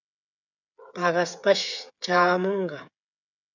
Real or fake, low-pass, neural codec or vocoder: fake; 7.2 kHz; codec, 16 kHz, 8 kbps, FreqCodec, smaller model